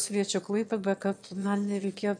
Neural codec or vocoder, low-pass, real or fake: autoencoder, 22.05 kHz, a latent of 192 numbers a frame, VITS, trained on one speaker; 9.9 kHz; fake